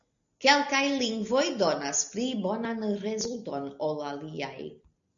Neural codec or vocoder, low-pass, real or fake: none; 7.2 kHz; real